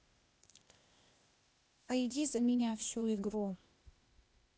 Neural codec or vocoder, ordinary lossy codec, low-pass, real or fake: codec, 16 kHz, 0.8 kbps, ZipCodec; none; none; fake